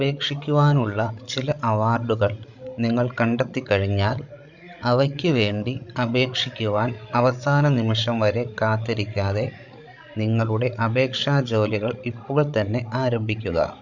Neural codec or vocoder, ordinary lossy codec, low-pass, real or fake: codec, 16 kHz, 16 kbps, FreqCodec, larger model; Opus, 64 kbps; 7.2 kHz; fake